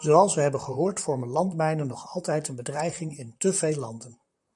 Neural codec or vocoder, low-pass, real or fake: vocoder, 44.1 kHz, 128 mel bands, Pupu-Vocoder; 10.8 kHz; fake